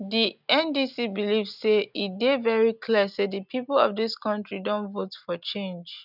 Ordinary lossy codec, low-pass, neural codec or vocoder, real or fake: none; 5.4 kHz; none; real